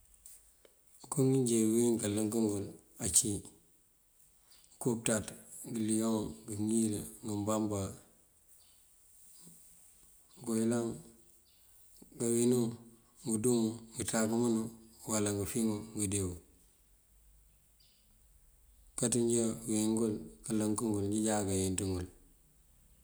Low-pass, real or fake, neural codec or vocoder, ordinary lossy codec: none; real; none; none